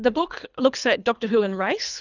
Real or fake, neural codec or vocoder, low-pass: fake; codec, 24 kHz, 3 kbps, HILCodec; 7.2 kHz